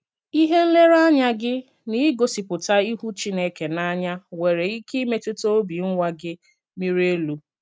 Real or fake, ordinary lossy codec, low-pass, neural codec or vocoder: real; none; none; none